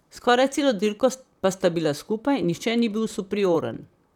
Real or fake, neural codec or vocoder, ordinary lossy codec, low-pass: fake; vocoder, 44.1 kHz, 128 mel bands, Pupu-Vocoder; none; 19.8 kHz